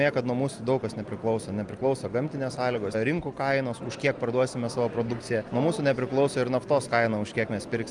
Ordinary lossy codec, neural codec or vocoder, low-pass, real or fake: Opus, 32 kbps; none; 10.8 kHz; real